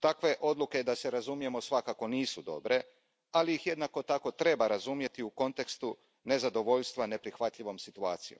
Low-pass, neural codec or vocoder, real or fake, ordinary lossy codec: none; none; real; none